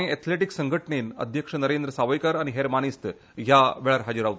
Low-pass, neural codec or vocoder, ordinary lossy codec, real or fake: none; none; none; real